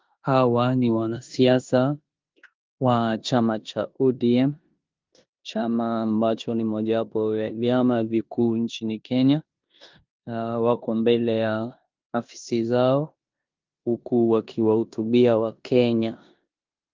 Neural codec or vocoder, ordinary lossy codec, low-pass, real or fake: codec, 16 kHz in and 24 kHz out, 0.9 kbps, LongCat-Audio-Codec, four codebook decoder; Opus, 32 kbps; 7.2 kHz; fake